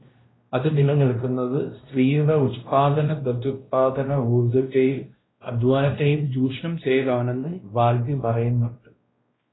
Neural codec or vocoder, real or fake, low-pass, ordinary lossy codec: codec, 16 kHz, 1 kbps, X-Codec, WavLM features, trained on Multilingual LibriSpeech; fake; 7.2 kHz; AAC, 16 kbps